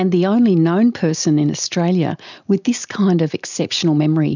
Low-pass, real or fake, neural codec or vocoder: 7.2 kHz; real; none